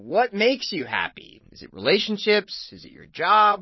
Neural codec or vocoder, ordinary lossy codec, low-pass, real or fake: vocoder, 22.05 kHz, 80 mel bands, Vocos; MP3, 24 kbps; 7.2 kHz; fake